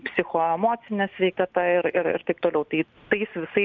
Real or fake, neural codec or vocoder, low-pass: real; none; 7.2 kHz